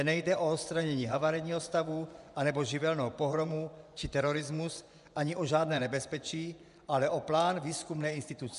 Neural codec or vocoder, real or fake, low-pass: vocoder, 24 kHz, 100 mel bands, Vocos; fake; 10.8 kHz